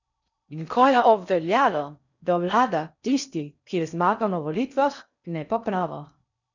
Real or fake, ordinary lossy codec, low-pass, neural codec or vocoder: fake; none; 7.2 kHz; codec, 16 kHz in and 24 kHz out, 0.6 kbps, FocalCodec, streaming, 4096 codes